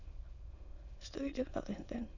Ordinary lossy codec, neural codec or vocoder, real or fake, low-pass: Opus, 64 kbps; autoencoder, 22.05 kHz, a latent of 192 numbers a frame, VITS, trained on many speakers; fake; 7.2 kHz